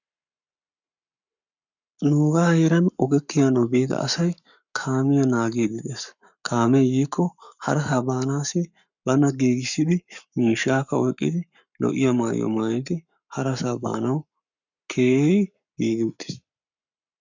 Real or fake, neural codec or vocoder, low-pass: fake; codec, 44.1 kHz, 7.8 kbps, Pupu-Codec; 7.2 kHz